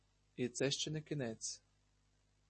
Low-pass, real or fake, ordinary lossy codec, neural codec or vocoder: 10.8 kHz; real; MP3, 32 kbps; none